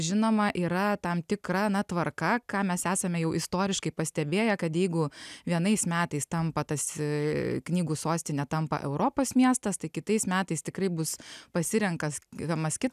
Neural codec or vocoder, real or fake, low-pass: none; real; 14.4 kHz